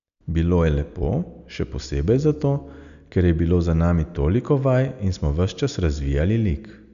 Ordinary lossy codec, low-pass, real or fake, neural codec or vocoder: none; 7.2 kHz; real; none